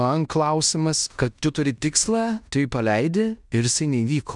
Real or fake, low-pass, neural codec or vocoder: fake; 10.8 kHz; codec, 16 kHz in and 24 kHz out, 0.9 kbps, LongCat-Audio-Codec, fine tuned four codebook decoder